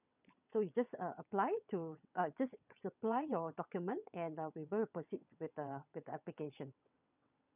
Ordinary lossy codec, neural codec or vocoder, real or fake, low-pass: none; vocoder, 22.05 kHz, 80 mel bands, WaveNeXt; fake; 3.6 kHz